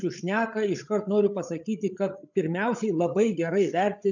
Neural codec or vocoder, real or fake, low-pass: codec, 16 kHz, 8 kbps, FreqCodec, larger model; fake; 7.2 kHz